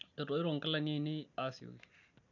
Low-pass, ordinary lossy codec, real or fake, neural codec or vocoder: 7.2 kHz; none; real; none